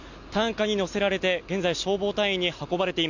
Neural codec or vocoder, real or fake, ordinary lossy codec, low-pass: none; real; none; 7.2 kHz